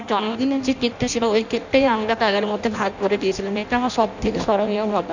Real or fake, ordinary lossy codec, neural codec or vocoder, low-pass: fake; none; codec, 16 kHz in and 24 kHz out, 0.6 kbps, FireRedTTS-2 codec; 7.2 kHz